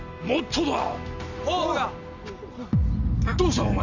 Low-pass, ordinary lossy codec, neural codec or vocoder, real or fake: 7.2 kHz; none; none; real